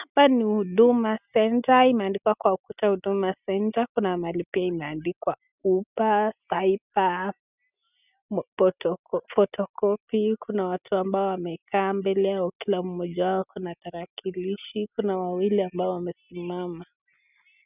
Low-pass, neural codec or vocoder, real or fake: 3.6 kHz; none; real